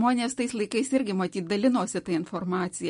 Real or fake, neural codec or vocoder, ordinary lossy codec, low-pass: real; none; MP3, 48 kbps; 10.8 kHz